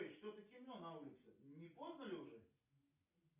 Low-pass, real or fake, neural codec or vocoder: 3.6 kHz; real; none